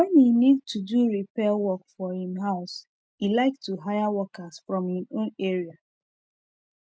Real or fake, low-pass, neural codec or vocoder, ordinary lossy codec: real; none; none; none